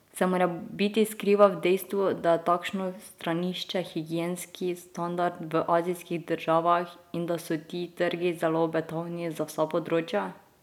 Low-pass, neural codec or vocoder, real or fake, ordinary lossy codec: 19.8 kHz; none; real; none